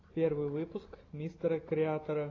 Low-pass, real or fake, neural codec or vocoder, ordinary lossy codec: 7.2 kHz; real; none; AAC, 32 kbps